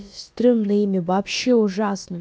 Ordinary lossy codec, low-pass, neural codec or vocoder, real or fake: none; none; codec, 16 kHz, about 1 kbps, DyCAST, with the encoder's durations; fake